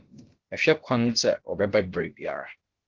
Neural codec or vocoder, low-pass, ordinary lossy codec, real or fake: codec, 16 kHz, about 1 kbps, DyCAST, with the encoder's durations; 7.2 kHz; Opus, 16 kbps; fake